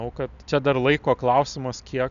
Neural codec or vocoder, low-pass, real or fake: none; 7.2 kHz; real